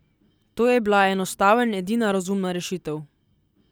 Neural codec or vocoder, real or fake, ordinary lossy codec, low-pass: none; real; none; none